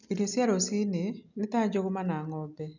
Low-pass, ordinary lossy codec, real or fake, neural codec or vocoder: 7.2 kHz; none; real; none